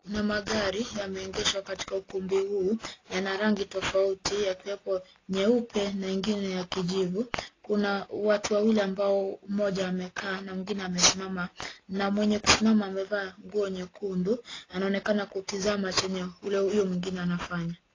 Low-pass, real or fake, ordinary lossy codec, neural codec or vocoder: 7.2 kHz; real; AAC, 32 kbps; none